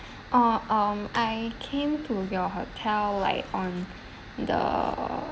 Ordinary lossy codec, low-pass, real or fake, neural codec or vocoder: none; none; real; none